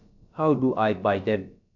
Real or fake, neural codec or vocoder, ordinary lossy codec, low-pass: fake; codec, 16 kHz, about 1 kbps, DyCAST, with the encoder's durations; none; 7.2 kHz